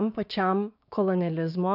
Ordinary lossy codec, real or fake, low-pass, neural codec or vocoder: AAC, 48 kbps; fake; 5.4 kHz; codec, 16 kHz, 6 kbps, DAC